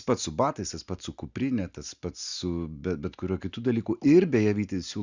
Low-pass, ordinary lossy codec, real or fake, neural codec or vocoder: 7.2 kHz; Opus, 64 kbps; real; none